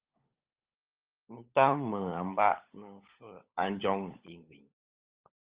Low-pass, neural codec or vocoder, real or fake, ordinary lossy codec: 3.6 kHz; codec, 16 kHz, 16 kbps, FunCodec, trained on LibriTTS, 50 frames a second; fake; Opus, 24 kbps